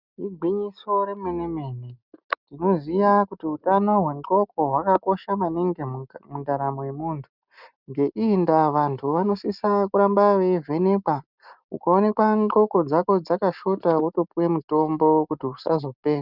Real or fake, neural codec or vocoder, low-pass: real; none; 5.4 kHz